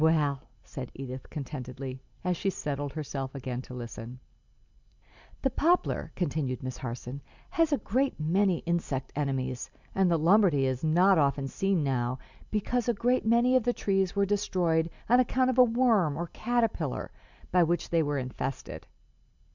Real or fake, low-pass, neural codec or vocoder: real; 7.2 kHz; none